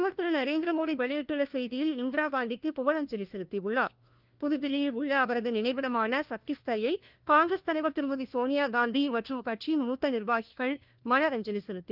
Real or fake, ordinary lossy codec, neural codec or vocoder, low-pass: fake; Opus, 32 kbps; codec, 16 kHz, 1 kbps, FunCodec, trained on LibriTTS, 50 frames a second; 5.4 kHz